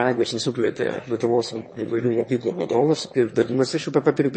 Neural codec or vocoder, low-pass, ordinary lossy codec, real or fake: autoencoder, 22.05 kHz, a latent of 192 numbers a frame, VITS, trained on one speaker; 9.9 kHz; MP3, 32 kbps; fake